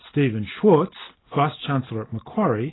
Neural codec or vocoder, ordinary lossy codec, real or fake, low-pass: none; AAC, 16 kbps; real; 7.2 kHz